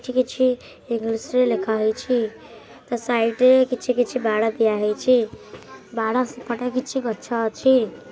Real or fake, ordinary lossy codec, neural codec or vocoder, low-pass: real; none; none; none